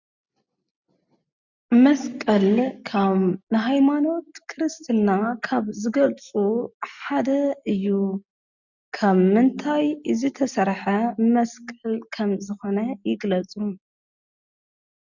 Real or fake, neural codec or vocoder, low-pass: real; none; 7.2 kHz